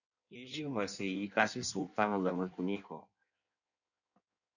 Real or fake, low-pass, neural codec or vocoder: fake; 7.2 kHz; codec, 16 kHz in and 24 kHz out, 1.1 kbps, FireRedTTS-2 codec